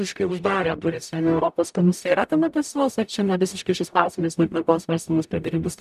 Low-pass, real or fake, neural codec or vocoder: 14.4 kHz; fake; codec, 44.1 kHz, 0.9 kbps, DAC